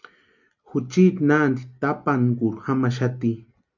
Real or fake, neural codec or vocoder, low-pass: real; none; 7.2 kHz